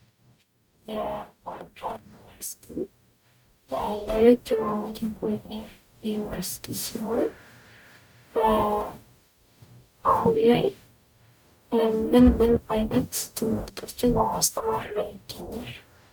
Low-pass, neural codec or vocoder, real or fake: 19.8 kHz; codec, 44.1 kHz, 0.9 kbps, DAC; fake